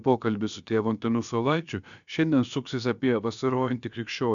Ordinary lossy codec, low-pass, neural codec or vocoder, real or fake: MP3, 96 kbps; 7.2 kHz; codec, 16 kHz, about 1 kbps, DyCAST, with the encoder's durations; fake